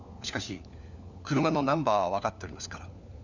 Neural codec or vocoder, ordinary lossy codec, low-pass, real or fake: codec, 16 kHz, 4 kbps, FunCodec, trained on LibriTTS, 50 frames a second; none; 7.2 kHz; fake